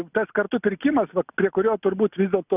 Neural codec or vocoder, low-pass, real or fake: none; 3.6 kHz; real